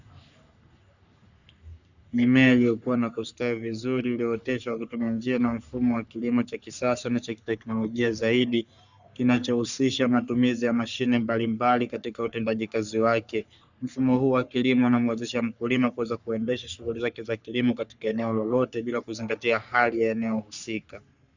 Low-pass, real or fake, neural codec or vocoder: 7.2 kHz; fake; codec, 44.1 kHz, 3.4 kbps, Pupu-Codec